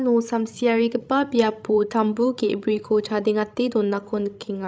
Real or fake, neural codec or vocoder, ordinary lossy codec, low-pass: fake; codec, 16 kHz, 16 kbps, FreqCodec, larger model; none; none